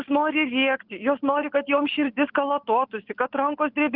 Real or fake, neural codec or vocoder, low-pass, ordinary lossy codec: real; none; 5.4 kHz; Opus, 32 kbps